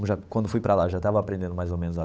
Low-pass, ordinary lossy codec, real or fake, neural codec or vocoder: none; none; fake; codec, 16 kHz, 8 kbps, FunCodec, trained on Chinese and English, 25 frames a second